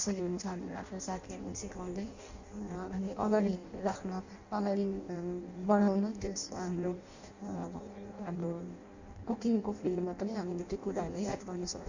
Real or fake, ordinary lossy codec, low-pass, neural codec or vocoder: fake; Opus, 64 kbps; 7.2 kHz; codec, 16 kHz in and 24 kHz out, 0.6 kbps, FireRedTTS-2 codec